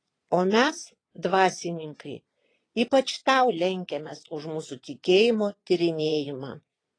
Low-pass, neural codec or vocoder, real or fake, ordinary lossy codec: 9.9 kHz; vocoder, 22.05 kHz, 80 mel bands, Vocos; fake; AAC, 32 kbps